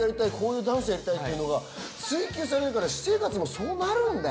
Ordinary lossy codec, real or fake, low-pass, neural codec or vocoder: none; real; none; none